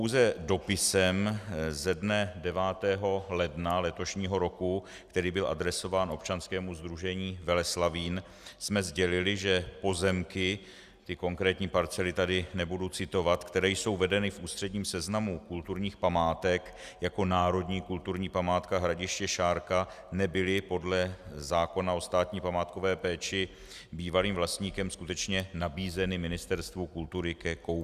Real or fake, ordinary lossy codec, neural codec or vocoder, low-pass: real; Opus, 64 kbps; none; 14.4 kHz